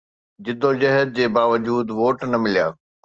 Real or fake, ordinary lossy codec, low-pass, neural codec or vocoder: real; Opus, 24 kbps; 7.2 kHz; none